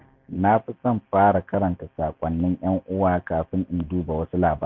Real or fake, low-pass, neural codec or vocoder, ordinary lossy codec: real; 7.2 kHz; none; MP3, 48 kbps